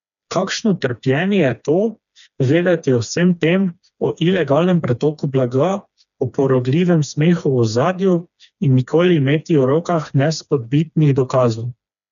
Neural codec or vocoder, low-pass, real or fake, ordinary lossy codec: codec, 16 kHz, 2 kbps, FreqCodec, smaller model; 7.2 kHz; fake; none